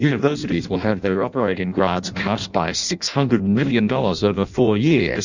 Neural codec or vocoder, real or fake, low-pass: codec, 16 kHz in and 24 kHz out, 0.6 kbps, FireRedTTS-2 codec; fake; 7.2 kHz